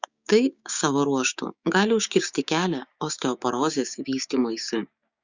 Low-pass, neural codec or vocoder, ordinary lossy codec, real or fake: 7.2 kHz; codec, 16 kHz, 6 kbps, DAC; Opus, 64 kbps; fake